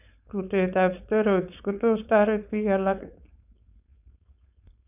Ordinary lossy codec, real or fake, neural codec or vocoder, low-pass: none; fake; codec, 16 kHz, 4.8 kbps, FACodec; 3.6 kHz